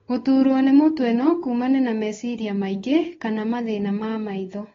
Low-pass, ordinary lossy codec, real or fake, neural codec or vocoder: 7.2 kHz; AAC, 24 kbps; fake; codec, 16 kHz, 8 kbps, FunCodec, trained on Chinese and English, 25 frames a second